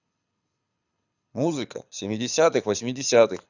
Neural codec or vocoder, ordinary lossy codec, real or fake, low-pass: codec, 24 kHz, 6 kbps, HILCodec; none; fake; 7.2 kHz